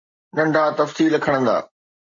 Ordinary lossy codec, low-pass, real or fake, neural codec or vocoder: MP3, 48 kbps; 7.2 kHz; real; none